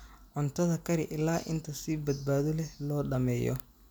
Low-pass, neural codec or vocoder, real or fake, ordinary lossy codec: none; none; real; none